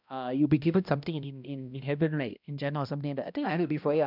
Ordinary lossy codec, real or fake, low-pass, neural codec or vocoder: none; fake; 5.4 kHz; codec, 16 kHz, 1 kbps, X-Codec, HuBERT features, trained on balanced general audio